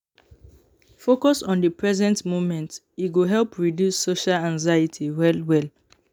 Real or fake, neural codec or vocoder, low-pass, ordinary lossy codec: real; none; 19.8 kHz; none